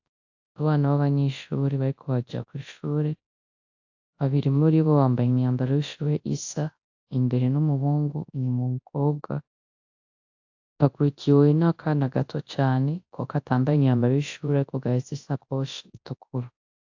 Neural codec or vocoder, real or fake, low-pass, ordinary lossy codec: codec, 24 kHz, 0.9 kbps, WavTokenizer, large speech release; fake; 7.2 kHz; AAC, 48 kbps